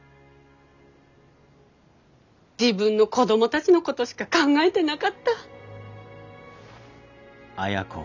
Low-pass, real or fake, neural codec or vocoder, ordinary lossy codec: 7.2 kHz; real; none; none